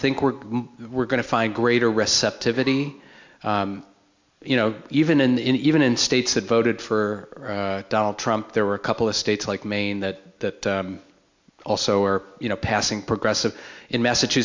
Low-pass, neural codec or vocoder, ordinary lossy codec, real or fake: 7.2 kHz; none; MP3, 64 kbps; real